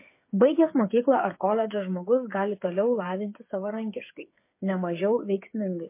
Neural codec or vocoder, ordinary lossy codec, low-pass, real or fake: codec, 16 kHz, 8 kbps, FreqCodec, smaller model; MP3, 24 kbps; 3.6 kHz; fake